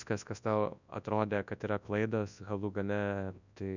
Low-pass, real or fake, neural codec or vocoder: 7.2 kHz; fake; codec, 24 kHz, 0.9 kbps, WavTokenizer, large speech release